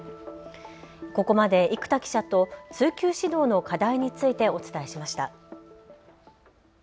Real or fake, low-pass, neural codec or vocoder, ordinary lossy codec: real; none; none; none